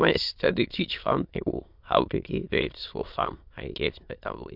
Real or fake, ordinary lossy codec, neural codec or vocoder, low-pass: fake; MP3, 48 kbps; autoencoder, 22.05 kHz, a latent of 192 numbers a frame, VITS, trained on many speakers; 5.4 kHz